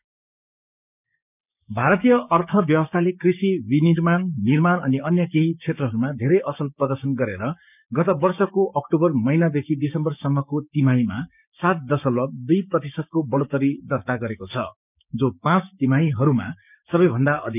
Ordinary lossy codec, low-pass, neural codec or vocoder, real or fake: none; 3.6 kHz; codec, 16 kHz, 6 kbps, DAC; fake